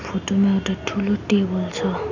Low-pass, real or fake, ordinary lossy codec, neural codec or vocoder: 7.2 kHz; real; none; none